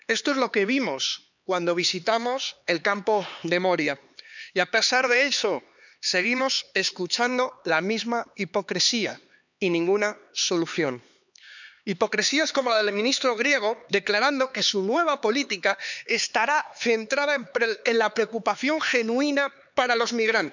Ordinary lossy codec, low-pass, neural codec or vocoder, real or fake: none; 7.2 kHz; codec, 16 kHz, 4 kbps, X-Codec, HuBERT features, trained on LibriSpeech; fake